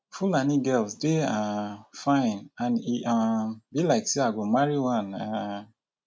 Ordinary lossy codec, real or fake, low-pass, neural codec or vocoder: none; real; none; none